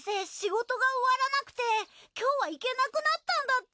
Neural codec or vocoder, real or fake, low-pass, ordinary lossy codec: none; real; none; none